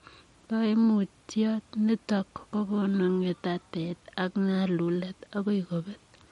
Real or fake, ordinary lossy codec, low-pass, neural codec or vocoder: real; MP3, 48 kbps; 14.4 kHz; none